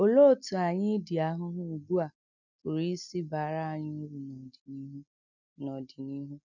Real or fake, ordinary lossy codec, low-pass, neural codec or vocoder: real; none; 7.2 kHz; none